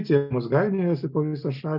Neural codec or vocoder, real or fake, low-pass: none; real; 5.4 kHz